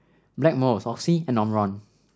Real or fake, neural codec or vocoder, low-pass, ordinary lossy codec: real; none; none; none